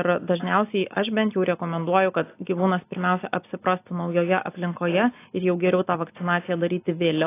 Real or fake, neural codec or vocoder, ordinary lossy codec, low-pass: real; none; AAC, 24 kbps; 3.6 kHz